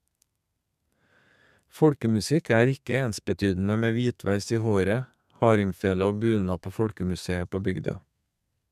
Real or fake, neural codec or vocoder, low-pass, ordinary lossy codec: fake; codec, 32 kHz, 1.9 kbps, SNAC; 14.4 kHz; none